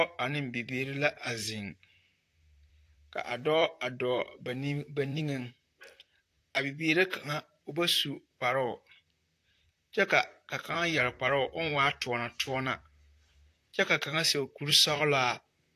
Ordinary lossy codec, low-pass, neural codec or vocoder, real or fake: AAC, 64 kbps; 14.4 kHz; vocoder, 44.1 kHz, 128 mel bands, Pupu-Vocoder; fake